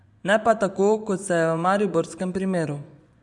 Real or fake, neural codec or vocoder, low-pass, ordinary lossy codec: fake; vocoder, 24 kHz, 100 mel bands, Vocos; 10.8 kHz; none